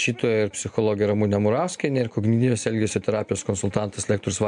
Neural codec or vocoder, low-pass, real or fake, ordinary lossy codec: none; 10.8 kHz; real; MP3, 64 kbps